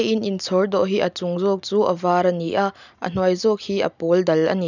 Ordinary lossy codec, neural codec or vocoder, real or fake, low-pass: none; none; real; 7.2 kHz